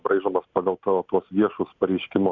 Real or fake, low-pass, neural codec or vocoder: real; 7.2 kHz; none